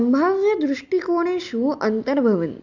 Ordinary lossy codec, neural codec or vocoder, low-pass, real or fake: none; none; 7.2 kHz; real